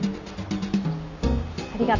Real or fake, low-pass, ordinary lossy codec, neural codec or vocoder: real; 7.2 kHz; none; none